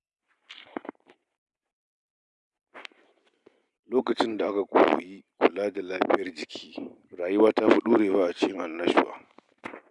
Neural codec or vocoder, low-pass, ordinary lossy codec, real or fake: none; 10.8 kHz; none; real